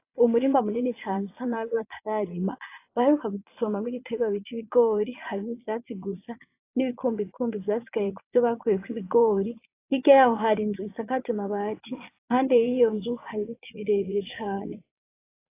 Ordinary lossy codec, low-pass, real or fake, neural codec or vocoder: AAC, 24 kbps; 3.6 kHz; fake; vocoder, 22.05 kHz, 80 mel bands, Vocos